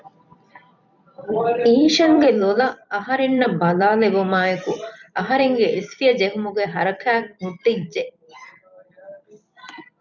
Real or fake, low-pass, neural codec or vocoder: fake; 7.2 kHz; vocoder, 44.1 kHz, 128 mel bands every 256 samples, BigVGAN v2